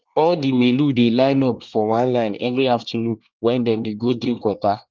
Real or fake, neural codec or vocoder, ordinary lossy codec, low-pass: fake; codec, 24 kHz, 1 kbps, SNAC; Opus, 24 kbps; 7.2 kHz